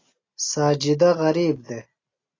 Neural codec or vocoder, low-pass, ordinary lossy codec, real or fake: none; 7.2 kHz; AAC, 32 kbps; real